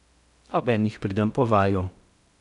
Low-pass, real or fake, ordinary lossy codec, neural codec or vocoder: 10.8 kHz; fake; none; codec, 16 kHz in and 24 kHz out, 0.6 kbps, FocalCodec, streaming, 2048 codes